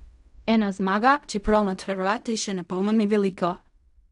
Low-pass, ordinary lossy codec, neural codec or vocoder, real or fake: 10.8 kHz; none; codec, 16 kHz in and 24 kHz out, 0.4 kbps, LongCat-Audio-Codec, fine tuned four codebook decoder; fake